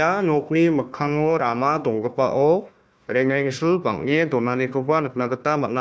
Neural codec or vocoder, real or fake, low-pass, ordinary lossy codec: codec, 16 kHz, 1 kbps, FunCodec, trained on Chinese and English, 50 frames a second; fake; none; none